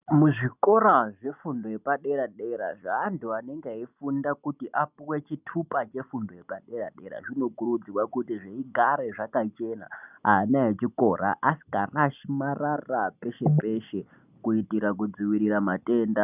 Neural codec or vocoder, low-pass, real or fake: none; 3.6 kHz; real